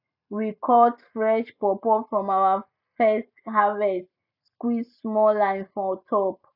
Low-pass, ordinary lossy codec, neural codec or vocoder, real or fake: 5.4 kHz; none; none; real